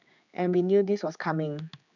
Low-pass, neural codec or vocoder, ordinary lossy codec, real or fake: 7.2 kHz; codec, 16 kHz, 4 kbps, X-Codec, HuBERT features, trained on general audio; none; fake